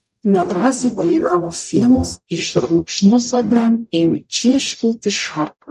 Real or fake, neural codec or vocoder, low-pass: fake; codec, 44.1 kHz, 0.9 kbps, DAC; 14.4 kHz